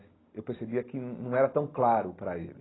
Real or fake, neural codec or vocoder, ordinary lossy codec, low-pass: real; none; AAC, 16 kbps; 7.2 kHz